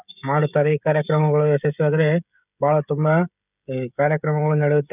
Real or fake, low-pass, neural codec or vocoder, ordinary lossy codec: fake; 3.6 kHz; codec, 16 kHz, 16 kbps, FreqCodec, smaller model; none